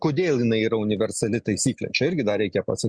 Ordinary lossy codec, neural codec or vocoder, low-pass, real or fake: Opus, 64 kbps; none; 9.9 kHz; real